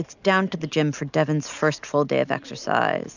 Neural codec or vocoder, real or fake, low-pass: none; real; 7.2 kHz